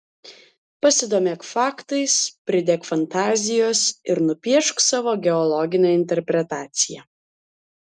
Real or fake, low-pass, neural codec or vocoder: real; 9.9 kHz; none